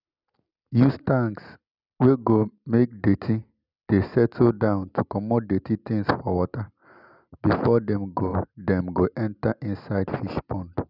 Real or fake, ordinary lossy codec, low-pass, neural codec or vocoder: real; none; 5.4 kHz; none